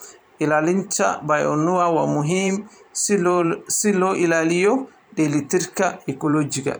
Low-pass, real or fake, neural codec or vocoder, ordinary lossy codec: none; fake; vocoder, 44.1 kHz, 128 mel bands every 512 samples, BigVGAN v2; none